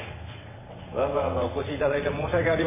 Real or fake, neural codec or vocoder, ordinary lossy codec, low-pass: fake; vocoder, 22.05 kHz, 80 mel bands, WaveNeXt; MP3, 16 kbps; 3.6 kHz